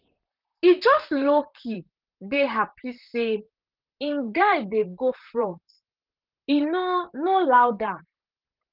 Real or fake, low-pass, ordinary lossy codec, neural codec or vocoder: fake; 5.4 kHz; Opus, 32 kbps; vocoder, 44.1 kHz, 128 mel bands, Pupu-Vocoder